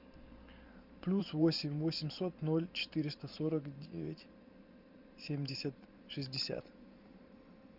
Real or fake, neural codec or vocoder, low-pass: fake; vocoder, 44.1 kHz, 128 mel bands every 512 samples, BigVGAN v2; 5.4 kHz